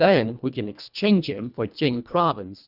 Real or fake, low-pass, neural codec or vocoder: fake; 5.4 kHz; codec, 24 kHz, 1.5 kbps, HILCodec